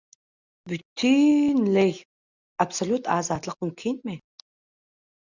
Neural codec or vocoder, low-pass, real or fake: none; 7.2 kHz; real